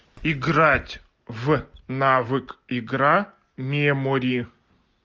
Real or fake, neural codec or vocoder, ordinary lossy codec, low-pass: real; none; Opus, 24 kbps; 7.2 kHz